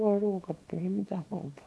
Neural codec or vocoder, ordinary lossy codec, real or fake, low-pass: codec, 24 kHz, 0.9 kbps, WavTokenizer, small release; none; fake; none